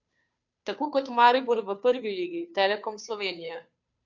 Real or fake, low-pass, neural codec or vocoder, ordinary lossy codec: fake; 7.2 kHz; codec, 16 kHz, 2 kbps, FunCodec, trained on Chinese and English, 25 frames a second; none